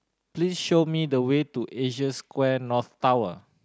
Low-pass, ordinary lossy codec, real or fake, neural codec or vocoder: none; none; real; none